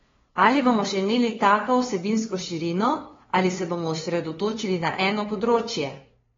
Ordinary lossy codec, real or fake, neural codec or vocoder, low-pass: AAC, 24 kbps; fake; codec, 16 kHz, 2 kbps, FunCodec, trained on LibriTTS, 25 frames a second; 7.2 kHz